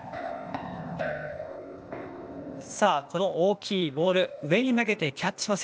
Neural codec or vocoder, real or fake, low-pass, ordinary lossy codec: codec, 16 kHz, 0.8 kbps, ZipCodec; fake; none; none